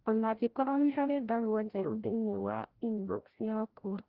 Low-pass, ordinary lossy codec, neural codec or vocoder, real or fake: 5.4 kHz; Opus, 16 kbps; codec, 16 kHz, 0.5 kbps, FreqCodec, larger model; fake